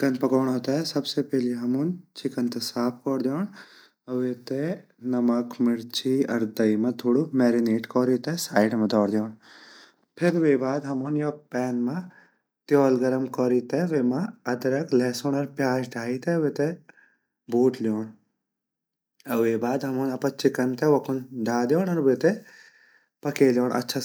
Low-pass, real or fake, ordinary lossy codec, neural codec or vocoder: none; real; none; none